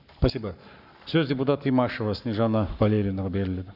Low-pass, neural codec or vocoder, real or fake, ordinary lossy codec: 5.4 kHz; codec, 44.1 kHz, 7.8 kbps, Pupu-Codec; fake; AAC, 48 kbps